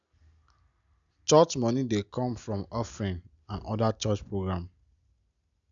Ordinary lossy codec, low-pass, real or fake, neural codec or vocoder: none; 7.2 kHz; real; none